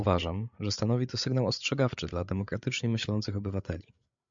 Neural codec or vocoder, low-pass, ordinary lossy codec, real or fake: codec, 16 kHz, 8 kbps, FreqCodec, larger model; 7.2 kHz; MP3, 64 kbps; fake